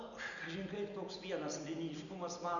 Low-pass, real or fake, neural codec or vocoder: 7.2 kHz; real; none